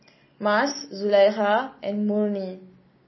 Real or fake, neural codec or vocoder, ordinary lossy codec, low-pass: real; none; MP3, 24 kbps; 7.2 kHz